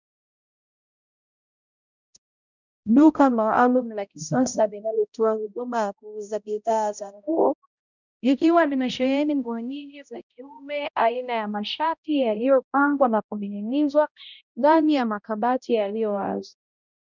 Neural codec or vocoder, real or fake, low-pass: codec, 16 kHz, 0.5 kbps, X-Codec, HuBERT features, trained on balanced general audio; fake; 7.2 kHz